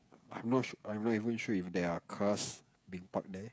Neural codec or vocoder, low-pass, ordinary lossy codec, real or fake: codec, 16 kHz, 16 kbps, FreqCodec, smaller model; none; none; fake